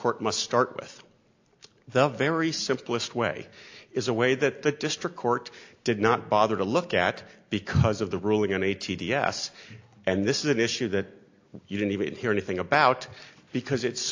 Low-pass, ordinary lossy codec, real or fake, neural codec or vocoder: 7.2 kHz; AAC, 48 kbps; real; none